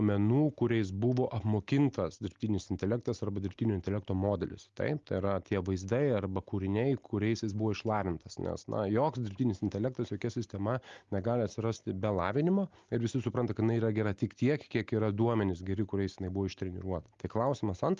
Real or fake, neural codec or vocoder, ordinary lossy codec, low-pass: real; none; Opus, 32 kbps; 7.2 kHz